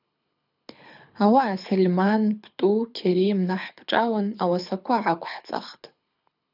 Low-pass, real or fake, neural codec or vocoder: 5.4 kHz; fake; codec, 24 kHz, 6 kbps, HILCodec